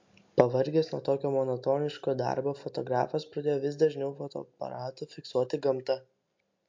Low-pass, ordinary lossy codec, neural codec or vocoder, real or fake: 7.2 kHz; MP3, 48 kbps; none; real